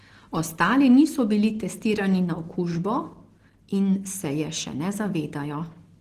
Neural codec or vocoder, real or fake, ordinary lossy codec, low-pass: none; real; Opus, 16 kbps; 14.4 kHz